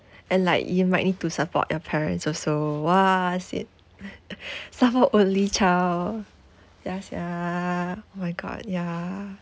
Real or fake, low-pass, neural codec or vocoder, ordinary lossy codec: real; none; none; none